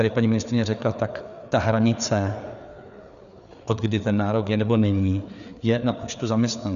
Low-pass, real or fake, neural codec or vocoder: 7.2 kHz; fake; codec, 16 kHz, 4 kbps, FreqCodec, larger model